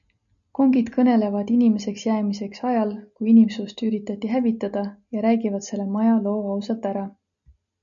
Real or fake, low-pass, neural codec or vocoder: real; 7.2 kHz; none